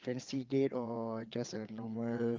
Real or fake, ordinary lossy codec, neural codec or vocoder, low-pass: fake; Opus, 24 kbps; vocoder, 22.05 kHz, 80 mel bands, WaveNeXt; 7.2 kHz